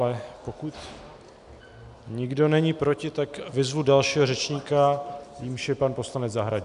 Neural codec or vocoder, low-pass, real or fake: none; 10.8 kHz; real